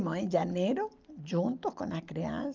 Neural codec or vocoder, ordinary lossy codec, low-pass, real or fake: none; Opus, 24 kbps; 7.2 kHz; real